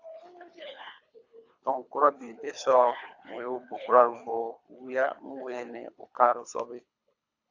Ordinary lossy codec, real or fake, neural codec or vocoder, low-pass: AAC, 48 kbps; fake; codec, 24 kHz, 3 kbps, HILCodec; 7.2 kHz